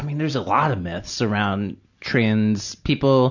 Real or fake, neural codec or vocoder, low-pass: real; none; 7.2 kHz